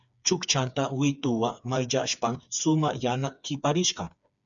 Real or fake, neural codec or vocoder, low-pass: fake; codec, 16 kHz, 4 kbps, FreqCodec, smaller model; 7.2 kHz